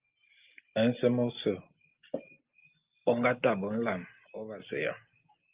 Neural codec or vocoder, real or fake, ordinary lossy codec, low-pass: none; real; Opus, 32 kbps; 3.6 kHz